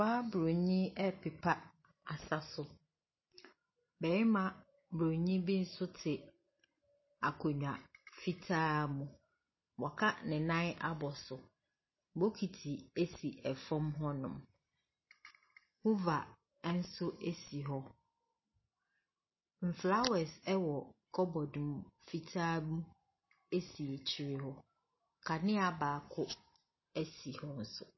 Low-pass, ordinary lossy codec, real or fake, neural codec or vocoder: 7.2 kHz; MP3, 24 kbps; real; none